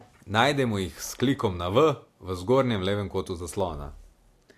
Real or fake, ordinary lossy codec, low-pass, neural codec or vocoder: real; AAC, 64 kbps; 14.4 kHz; none